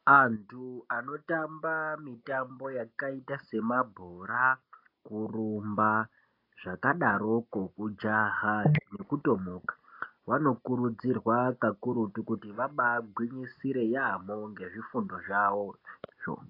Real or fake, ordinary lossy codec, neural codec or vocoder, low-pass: real; AAC, 32 kbps; none; 5.4 kHz